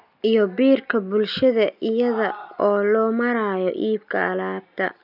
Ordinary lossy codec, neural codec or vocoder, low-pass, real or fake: none; none; 5.4 kHz; real